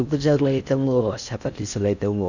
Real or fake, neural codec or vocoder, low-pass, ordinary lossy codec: fake; codec, 16 kHz in and 24 kHz out, 0.6 kbps, FocalCodec, streaming, 4096 codes; 7.2 kHz; none